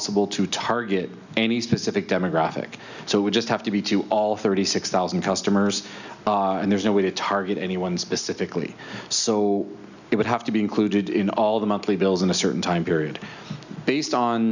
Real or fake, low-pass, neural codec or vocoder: real; 7.2 kHz; none